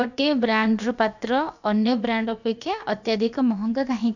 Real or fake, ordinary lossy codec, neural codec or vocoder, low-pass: fake; none; codec, 16 kHz, about 1 kbps, DyCAST, with the encoder's durations; 7.2 kHz